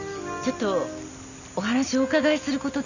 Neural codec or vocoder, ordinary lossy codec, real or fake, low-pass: none; none; real; 7.2 kHz